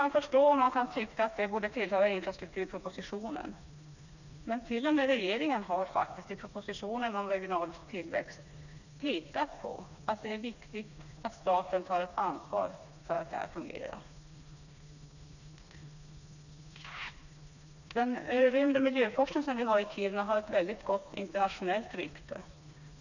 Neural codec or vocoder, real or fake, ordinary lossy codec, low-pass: codec, 16 kHz, 2 kbps, FreqCodec, smaller model; fake; none; 7.2 kHz